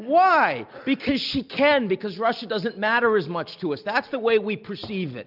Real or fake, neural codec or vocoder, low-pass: real; none; 5.4 kHz